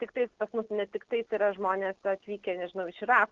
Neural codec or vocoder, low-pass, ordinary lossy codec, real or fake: none; 7.2 kHz; Opus, 16 kbps; real